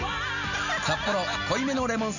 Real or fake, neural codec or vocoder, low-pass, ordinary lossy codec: real; none; 7.2 kHz; none